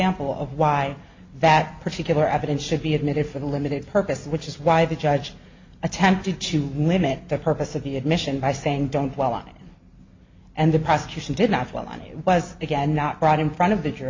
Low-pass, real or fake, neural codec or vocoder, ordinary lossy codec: 7.2 kHz; real; none; MP3, 64 kbps